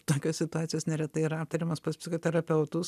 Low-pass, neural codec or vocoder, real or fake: 14.4 kHz; none; real